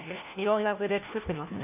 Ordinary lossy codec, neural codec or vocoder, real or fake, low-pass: none; codec, 16 kHz, 1 kbps, FunCodec, trained on LibriTTS, 50 frames a second; fake; 3.6 kHz